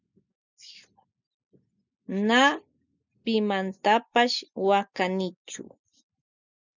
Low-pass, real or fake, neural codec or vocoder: 7.2 kHz; real; none